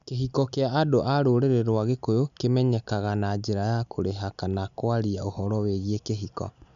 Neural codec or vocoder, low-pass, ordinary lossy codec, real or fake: none; 7.2 kHz; none; real